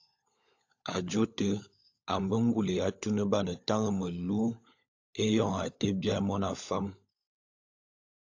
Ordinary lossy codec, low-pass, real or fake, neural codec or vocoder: AAC, 48 kbps; 7.2 kHz; fake; codec, 16 kHz, 16 kbps, FunCodec, trained on LibriTTS, 50 frames a second